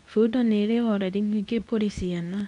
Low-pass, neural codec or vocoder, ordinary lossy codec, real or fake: 10.8 kHz; codec, 24 kHz, 0.9 kbps, WavTokenizer, medium speech release version 2; none; fake